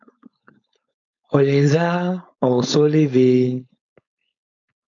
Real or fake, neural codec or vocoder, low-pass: fake; codec, 16 kHz, 4.8 kbps, FACodec; 7.2 kHz